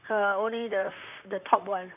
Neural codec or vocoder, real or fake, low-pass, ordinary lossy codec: vocoder, 44.1 kHz, 128 mel bands, Pupu-Vocoder; fake; 3.6 kHz; none